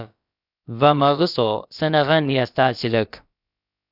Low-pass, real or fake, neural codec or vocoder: 5.4 kHz; fake; codec, 16 kHz, about 1 kbps, DyCAST, with the encoder's durations